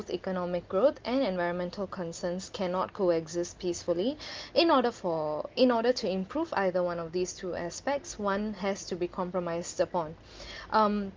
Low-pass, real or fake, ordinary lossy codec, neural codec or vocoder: 7.2 kHz; real; Opus, 32 kbps; none